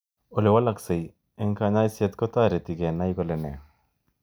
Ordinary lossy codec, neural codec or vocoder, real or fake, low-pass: none; none; real; none